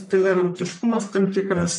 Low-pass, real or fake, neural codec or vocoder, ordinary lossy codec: 10.8 kHz; fake; codec, 44.1 kHz, 1.7 kbps, Pupu-Codec; MP3, 96 kbps